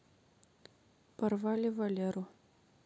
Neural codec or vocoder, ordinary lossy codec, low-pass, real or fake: none; none; none; real